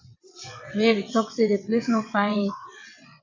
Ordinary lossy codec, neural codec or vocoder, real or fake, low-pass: MP3, 64 kbps; vocoder, 22.05 kHz, 80 mel bands, WaveNeXt; fake; 7.2 kHz